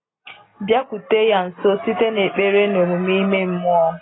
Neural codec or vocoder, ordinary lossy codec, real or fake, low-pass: none; AAC, 16 kbps; real; 7.2 kHz